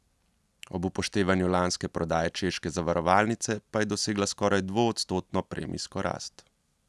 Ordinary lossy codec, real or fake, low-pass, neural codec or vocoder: none; real; none; none